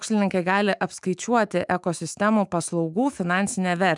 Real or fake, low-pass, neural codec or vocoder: fake; 10.8 kHz; autoencoder, 48 kHz, 128 numbers a frame, DAC-VAE, trained on Japanese speech